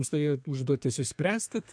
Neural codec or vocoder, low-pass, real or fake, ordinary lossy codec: codec, 32 kHz, 1.9 kbps, SNAC; 9.9 kHz; fake; MP3, 64 kbps